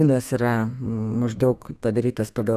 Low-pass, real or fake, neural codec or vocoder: 14.4 kHz; fake; codec, 32 kHz, 1.9 kbps, SNAC